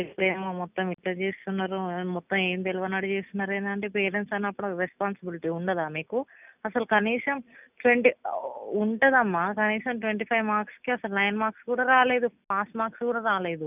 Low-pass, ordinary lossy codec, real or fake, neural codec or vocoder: 3.6 kHz; none; real; none